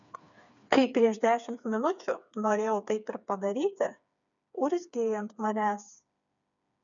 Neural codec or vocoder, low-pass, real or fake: codec, 16 kHz, 4 kbps, FreqCodec, smaller model; 7.2 kHz; fake